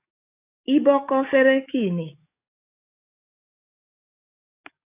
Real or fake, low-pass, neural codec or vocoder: fake; 3.6 kHz; codec, 44.1 kHz, 7.8 kbps, DAC